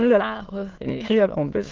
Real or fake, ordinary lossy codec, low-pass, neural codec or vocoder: fake; Opus, 16 kbps; 7.2 kHz; autoencoder, 22.05 kHz, a latent of 192 numbers a frame, VITS, trained on many speakers